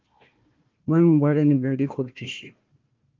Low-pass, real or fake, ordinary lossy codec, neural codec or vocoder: 7.2 kHz; fake; Opus, 24 kbps; codec, 16 kHz, 1 kbps, FunCodec, trained on Chinese and English, 50 frames a second